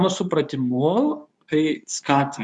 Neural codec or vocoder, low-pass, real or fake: codec, 24 kHz, 0.9 kbps, WavTokenizer, medium speech release version 2; 10.8 kHz; fake